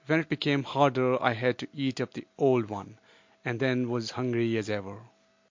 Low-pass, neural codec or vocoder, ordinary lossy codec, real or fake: 7.2 kHz; none; MP3, 48 kbps; real